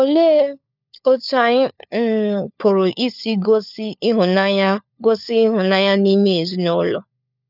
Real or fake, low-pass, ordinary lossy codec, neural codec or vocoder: fake; 5.4 kHz; none; codec, 16 kHz, 4 kbps, FunCodec, trained on LibriTTS, 50 frames a second